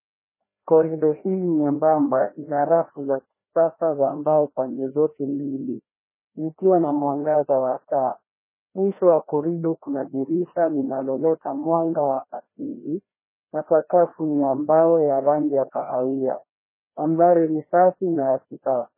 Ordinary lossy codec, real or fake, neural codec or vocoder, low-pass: MP3, 16 kbps; fake; codec, 16 kHz, 1 kbps, FreqCodec, larger model; 3.6 kHz